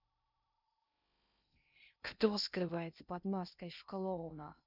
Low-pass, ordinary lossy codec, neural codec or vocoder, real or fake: 5.4 kHz; none; codec, 16 kHz in and 24 kHz out, 0.6 kbps, FocalCodec, streaming, 2048 codes; fake